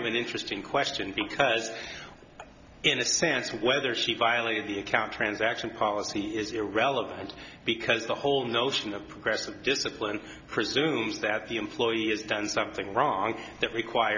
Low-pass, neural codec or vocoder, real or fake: 7.2 kHz; none; real